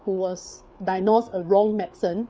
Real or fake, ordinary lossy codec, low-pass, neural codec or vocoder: fake; none; none; codec, 16 kHz, 4 kbps, FreqCodec, larger model